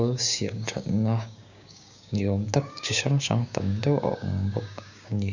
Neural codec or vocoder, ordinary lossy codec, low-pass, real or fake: codec, 16 kHz, 6 kbps, DAC; none; 7.2 kHz; fake